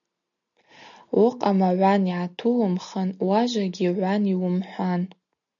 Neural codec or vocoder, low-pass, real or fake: none; 7.2 kHz; real